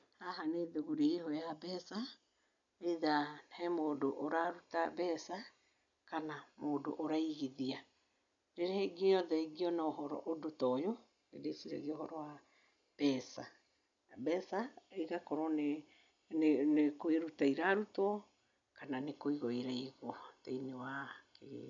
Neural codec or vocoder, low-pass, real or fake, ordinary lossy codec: none; 7.2 kHz; real; none